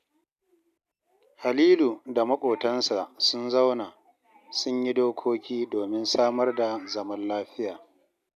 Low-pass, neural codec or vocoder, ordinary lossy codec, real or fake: 14.4 kHz; none; none; real